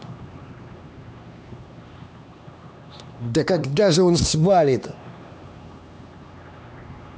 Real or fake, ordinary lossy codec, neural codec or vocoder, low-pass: fake; none; codec, 16 kHz, 2 kbps, X-Codec, HuBERT features, trained on LibriSpeech; none